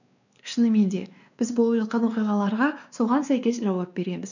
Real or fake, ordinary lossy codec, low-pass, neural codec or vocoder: fake; none; 7.2 kHz; codec, 16 kHz, 2 kbps, X-Codec, WavLM features, trained on Multilingual LibriSpeech